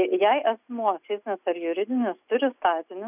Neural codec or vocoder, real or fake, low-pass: none; real; 3.6 kHz